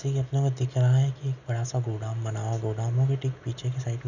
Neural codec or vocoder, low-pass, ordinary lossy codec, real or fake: none; 7.2 kHz; none; real